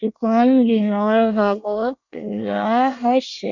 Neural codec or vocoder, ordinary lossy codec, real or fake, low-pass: codec, 24 kHz, 1 kbps, SNAC; none; fake; 7.2 kHz